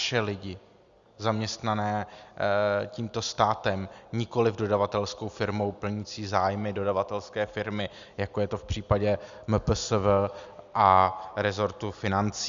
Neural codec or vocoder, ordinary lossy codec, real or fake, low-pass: none; Opus, 64 kbps; real; 7.2 kHz